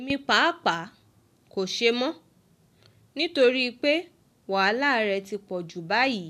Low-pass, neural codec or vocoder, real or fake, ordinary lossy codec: 14.4 kHz; none; real; none